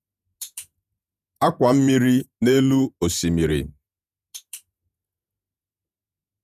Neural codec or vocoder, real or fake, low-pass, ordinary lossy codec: vocoder, 48 kHz, 128 mel bands, Vocos; fake; 14.4 kHz; none